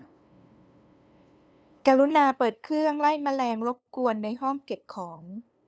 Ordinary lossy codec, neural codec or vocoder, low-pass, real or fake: none; codec, 16 kHz, 2 kbps, FunCodec, trained on LibriTTS, 25 frames a second; none; fake